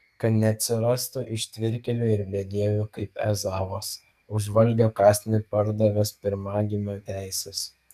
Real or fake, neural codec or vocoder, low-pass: fake; codec, 44.1 kHz, 2.6 kbps, SNAC; 14.4 kHz